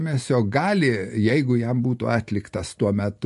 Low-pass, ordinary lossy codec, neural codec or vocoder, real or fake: 14.4 kHz; MP3, 48 kbps; none; real